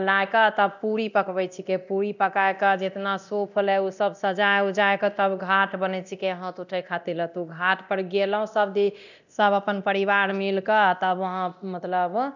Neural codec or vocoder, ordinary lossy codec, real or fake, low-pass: codec, 24 kHz, 0.9 kbps, DualCodec; none; fake; 7.2 kHz